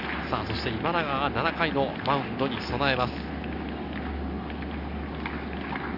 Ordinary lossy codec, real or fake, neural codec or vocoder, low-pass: none; real; none; 5.4 kHz